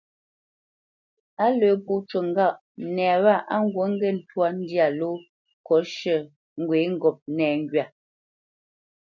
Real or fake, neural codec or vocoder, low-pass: real; none; 7.2 kHz